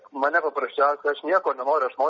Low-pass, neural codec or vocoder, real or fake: 7.2 kHz; none; real